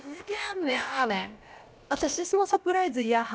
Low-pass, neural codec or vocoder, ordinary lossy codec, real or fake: none; codec, 16 kHz, about 1 kbps, DyCAST, with the encoder's durations; none; fake